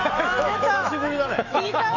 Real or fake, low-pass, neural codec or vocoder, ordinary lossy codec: fake; 7.2 kHz; autoencoder, 48 kHz, 128 numbers a frame, DAC-VAE, trained on Japanese speech; none